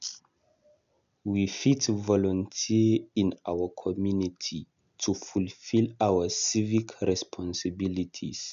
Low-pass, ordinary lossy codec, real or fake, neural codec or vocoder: 7.2 kHz; none; real; none